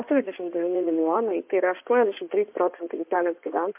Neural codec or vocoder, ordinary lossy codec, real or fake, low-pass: codec, 16 kHz in and 24 kHz out, 2.2 kbps, FireRedTTS-2 codec; MP3, 32 kbps; fake; 3.6 kHz